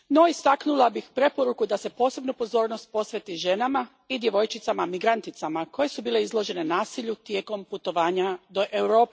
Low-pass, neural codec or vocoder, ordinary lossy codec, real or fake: none; none; none; real